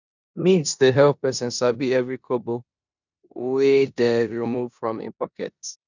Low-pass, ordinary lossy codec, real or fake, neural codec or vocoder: 7.2 kHz; none; fake; codec, 16 kHz in and 24 kHz out, 0.9 kbps, LongCat-Audio-Codec, four codebook decoder